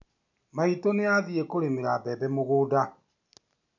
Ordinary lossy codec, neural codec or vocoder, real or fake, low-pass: none; none; real; 7.2 kHz